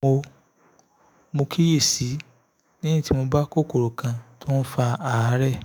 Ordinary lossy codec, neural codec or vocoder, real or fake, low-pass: none; autoencoder, 48 kHz, 128 numbers a frame, DAC-VAE, trained on Japanese speech; fake; none